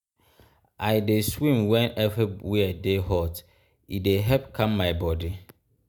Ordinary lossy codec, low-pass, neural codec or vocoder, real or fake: none; none; none; real